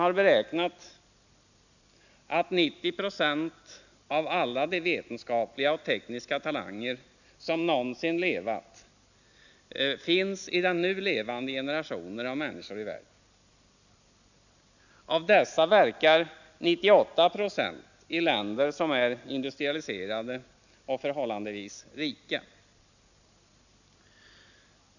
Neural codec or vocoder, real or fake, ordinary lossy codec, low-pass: none; real; none; 7.2 kHz